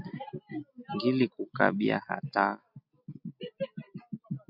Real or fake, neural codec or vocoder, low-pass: real; none; 5.4 kHz